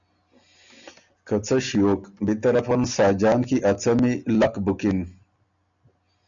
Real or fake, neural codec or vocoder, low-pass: real; none; 7.2 kHz